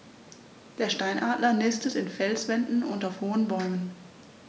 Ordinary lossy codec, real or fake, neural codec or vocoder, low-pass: none; real; none; none